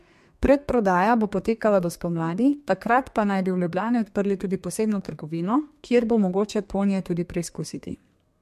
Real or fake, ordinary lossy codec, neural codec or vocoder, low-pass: fake; MP3, 64 kbps; codec, 32 kHz, 1.9 kbps, SNAC; 14.4 kHz